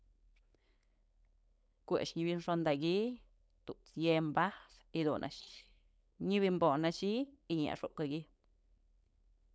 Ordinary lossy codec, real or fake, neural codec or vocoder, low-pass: none; fake; codec, 16 kHz, 4.8 kbps, FACodec; none